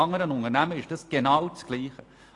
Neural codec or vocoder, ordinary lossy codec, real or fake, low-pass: none; AAC, 48 kbps; real; 10.8 kHz